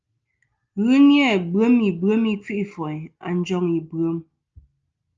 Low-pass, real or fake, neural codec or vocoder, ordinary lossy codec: 7.2 kHz; real; none; Opus, 24 kbps